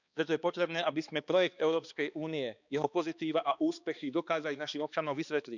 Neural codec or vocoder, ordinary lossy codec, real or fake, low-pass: codec, 16 kHz, 2 kbps, X-Codec, HuBERT features, trained on balanced general audio; none; fake; 7.2 kHz